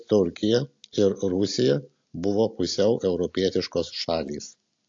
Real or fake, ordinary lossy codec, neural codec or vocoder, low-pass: real; AAC, 48 kbps; none; 7.2 kHz